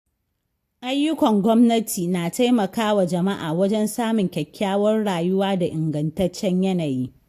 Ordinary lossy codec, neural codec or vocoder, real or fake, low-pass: AAC, 64 kbps; none; real; 14.4 kHz